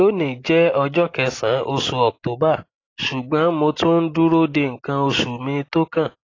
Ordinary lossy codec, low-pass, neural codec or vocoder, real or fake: AAC, 32 kbps; 7.2 kHz; none; real